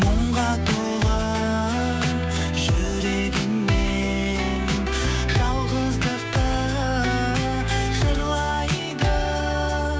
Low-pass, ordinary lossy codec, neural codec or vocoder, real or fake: none; none; codec, 16 kHz, 6 kbps, DAC; fake